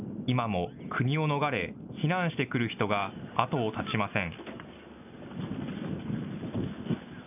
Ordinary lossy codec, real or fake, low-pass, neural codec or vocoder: none; real; 3.6 kHz; none